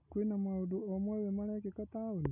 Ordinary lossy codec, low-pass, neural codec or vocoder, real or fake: none; 3.6 kHz; none; real